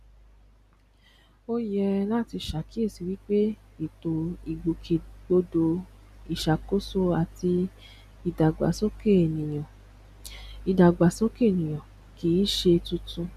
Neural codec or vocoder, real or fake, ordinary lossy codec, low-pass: none; real; none; 14.4 kHz